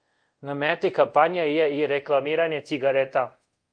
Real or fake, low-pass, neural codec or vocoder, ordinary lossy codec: fake; 9.9 kHz; codec, 24 kHz, 0.5 kbps, DualCodec; Opus, 32 kbps